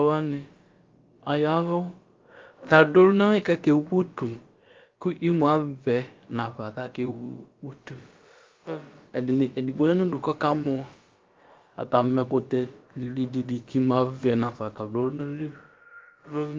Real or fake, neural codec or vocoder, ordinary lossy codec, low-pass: fake; codec, 16 kHz, about 1 kbps, DyCAST, with the encoder's durations; Opus, 24 kbps; 7.2 kHz